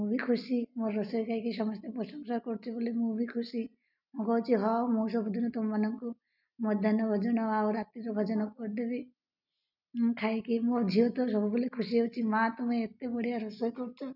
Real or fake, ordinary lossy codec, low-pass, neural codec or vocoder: real; none; 5.4 kHz; none